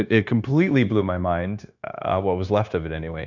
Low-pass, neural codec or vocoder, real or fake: 7.2 kHz; codec, 16 kHz, 0.9 kbps, LongCat-Audio-Codec; fake